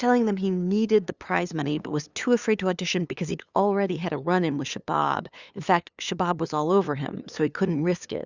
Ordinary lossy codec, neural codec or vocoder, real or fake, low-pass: Opus, 64 kbps; codec, 16 kHz, 2 kbps, FunCodec, trained on LibriTTS, 25 frames a second; fake; 7.2 kHz